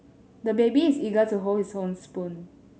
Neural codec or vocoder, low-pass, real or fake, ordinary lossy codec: none; none; real; none